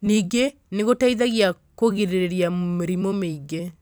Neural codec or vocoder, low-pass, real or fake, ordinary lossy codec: vocoder, 44.1 kHz, 128 mel bands every 256 samples, BigVGAN v2; none; fake; none